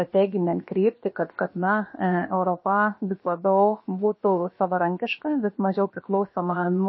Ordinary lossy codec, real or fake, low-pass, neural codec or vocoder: MP3, 24 kbps; fake; 7.2 kHz; codec, 16 kHz, about 1 kbps, DyCAST, with the encoder's durations